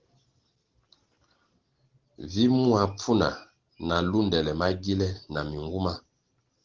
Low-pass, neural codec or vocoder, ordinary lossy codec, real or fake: 7.2 kHz; none; Opus, 16 kbps; real